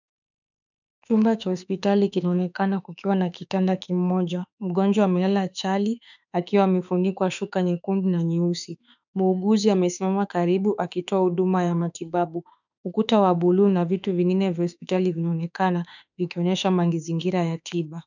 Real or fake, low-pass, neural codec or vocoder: fake; 7.2 kHz; autoencoder, 48 kHz, 32 numbers a frame, DAC-VAE, trained on Japanese speech